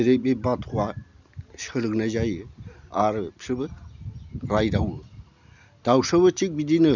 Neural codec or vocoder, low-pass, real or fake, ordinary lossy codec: none; 7.2 kHz; real; none